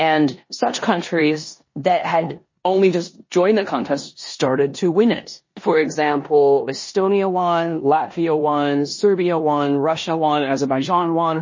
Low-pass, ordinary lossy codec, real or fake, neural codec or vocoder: 7.2 kHz; MP3, 32 kbps; fake; codec, 16 kHz in and 24 kHz out, 0.9 kbps, LongCat-Audio-Codec, four codebook decoder